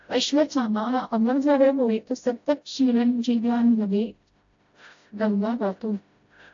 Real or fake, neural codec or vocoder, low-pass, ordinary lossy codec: fake; codec, 16 kHz, 0.5 kbps, FreqCodec, smaller model; 7.2 kHz; AAC, 48 kbps